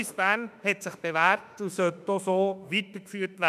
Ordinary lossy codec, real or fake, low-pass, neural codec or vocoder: none; fake; 14.4 kHz; autoencoder, 48 kHz, 32 numbers a frame, DAC-VAE, trained on Japanese speech